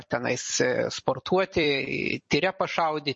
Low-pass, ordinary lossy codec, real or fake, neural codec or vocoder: 10.8 kHz; MP3, 32 kbps; fake; vocoder, 44.1 kHz, 128 mel bands every 256 samples, BigVGAN v2